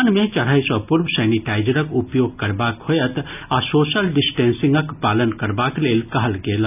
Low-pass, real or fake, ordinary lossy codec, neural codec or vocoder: 3.6 kHz; real; none; none